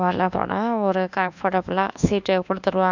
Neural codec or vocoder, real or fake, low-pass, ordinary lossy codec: codec, 24 kHz, 1.2 kbps, DualCodec; fake; 7.2 kHz; none